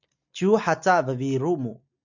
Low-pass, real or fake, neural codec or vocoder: 7.2 kHz; real; none